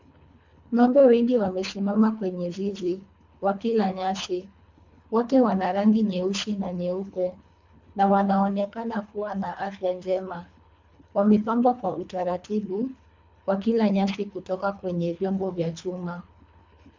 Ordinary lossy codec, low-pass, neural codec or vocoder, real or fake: MP3, 64 kbps; 7.2 kHz; codec, 24 kHz, 3 kbps, HILCodec; fake